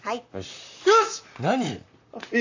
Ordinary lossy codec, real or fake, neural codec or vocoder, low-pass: AAC, 48 kbps; fake; vocoder, 44.1 kHz, 128 mel bands, Pupu-Vocoder; 7.2 kHz